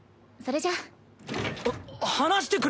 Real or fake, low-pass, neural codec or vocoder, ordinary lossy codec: real; none; none; none